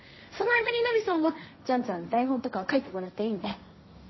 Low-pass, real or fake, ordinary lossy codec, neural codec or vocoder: 7.2 kHz; fake; MP3, 24 kbps; codec, 16 kHz, 1.1 kbps, Voila-Tokenizer